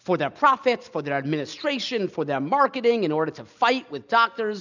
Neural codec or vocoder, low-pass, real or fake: none; 7.2 kHz; real